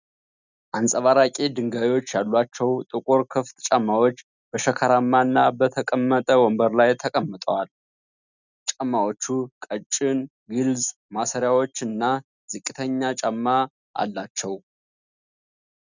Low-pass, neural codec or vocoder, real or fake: 7.2 kHz; none; real